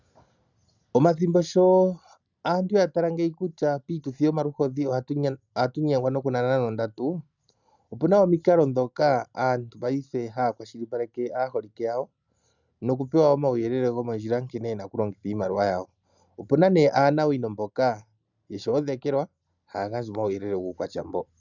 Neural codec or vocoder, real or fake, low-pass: none; real; 7.2 kHz